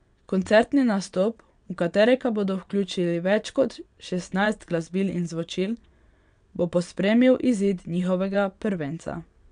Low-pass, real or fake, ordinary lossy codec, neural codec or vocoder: 9.9 kHz; fake; none; vocoder, 22.05 kHz, 80 mel bands, WaveNeXt